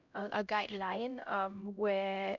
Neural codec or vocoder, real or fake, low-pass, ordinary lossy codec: codec, 16 kHz, 0.5 kbps, X-Codec, HuBERT features, trained on LibriSpeech; fake; 7.2 kHz; none